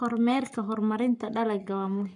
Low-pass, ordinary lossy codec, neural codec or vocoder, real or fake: 10.8 kHz; none; codec, 44.1 kHz, 7.8 kbps, Pupu-Codec; fake